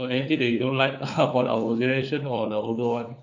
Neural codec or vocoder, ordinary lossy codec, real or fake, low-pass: codec, 16 kHz, 4 kbps, FunCodec, trained on LibriTTS, 50 frames a second; none; fake; 7.2 kHz